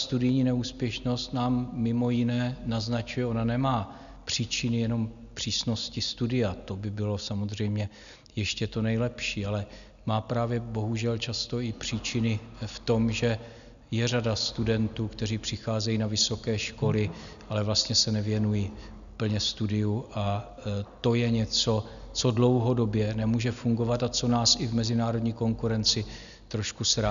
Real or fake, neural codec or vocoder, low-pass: real; none; 7.2 kHz